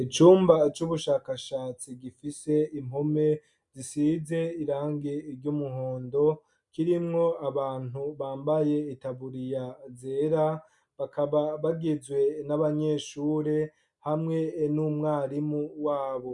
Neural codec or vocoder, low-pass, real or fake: none; 10.8 kHz; real